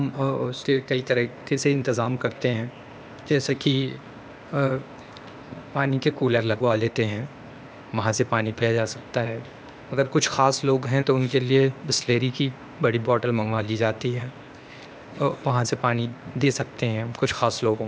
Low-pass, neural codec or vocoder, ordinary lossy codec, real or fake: none; codec, 16 kHz, 0.8 kbps, ZipCodec; none; fake